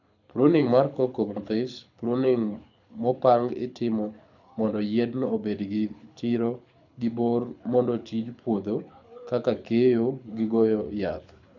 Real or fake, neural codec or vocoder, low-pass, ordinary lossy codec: fake; codec, 24 kHz, 6 kbps, HILCodec; 7.2 kHz; none